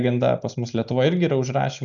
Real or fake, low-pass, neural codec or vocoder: real; 7.2 kHz; none